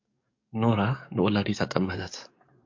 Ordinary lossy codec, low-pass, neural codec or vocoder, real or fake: MP3, 64 kbps; 7.2 kHz; codec, 44.1 kHz, 7.8 kbps, DAC; fake